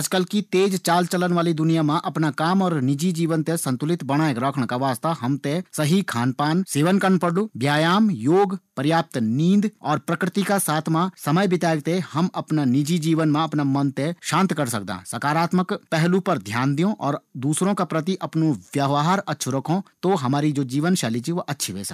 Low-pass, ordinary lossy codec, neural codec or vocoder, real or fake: 14.4 kHz; none; none; real